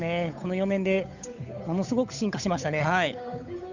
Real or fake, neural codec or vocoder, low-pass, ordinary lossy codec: fake; codec, 16 kHz, 8 kbps, FunCodec, trained on Chinese and English, 25 frames a second; 7.2 kHz; none